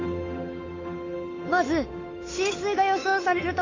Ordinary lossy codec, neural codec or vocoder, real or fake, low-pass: AAC, 32 kbps; codec, 16 kHz, 8 kbps, FunCodec, trained on Chinese and English, 25 frames a second; fake; 7.2 kHz